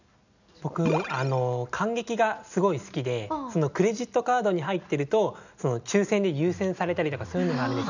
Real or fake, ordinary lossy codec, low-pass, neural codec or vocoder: real; none; 7.2 kHz; none